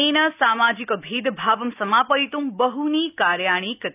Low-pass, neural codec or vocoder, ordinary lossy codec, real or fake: 3.6 kHz; none; none; real